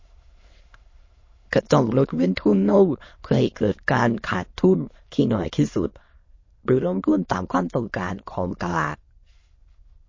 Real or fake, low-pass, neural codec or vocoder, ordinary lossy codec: fake; 7.2 kHz; autoencoder, 22.05 kHz, a latent of 192 numbers a frame, VITS, trained on many speakers; MP3, 32 kbps